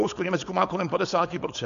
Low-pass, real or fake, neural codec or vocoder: 7.2 kHz; fake; codec, 16 kHz, 4.8 kbps, FACodec